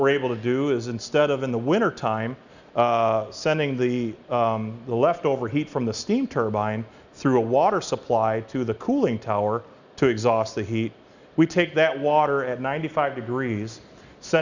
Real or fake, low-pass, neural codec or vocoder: real; 7.2 kHz; none